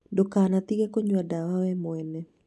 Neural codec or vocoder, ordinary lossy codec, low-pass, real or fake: none; none; none; real